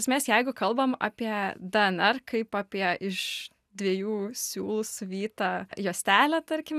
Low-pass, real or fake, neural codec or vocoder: 14.4 kHz; real; none